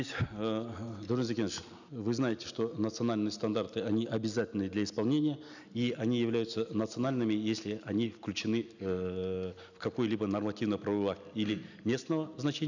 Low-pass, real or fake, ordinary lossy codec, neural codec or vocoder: 7.2 kHz; real; none; none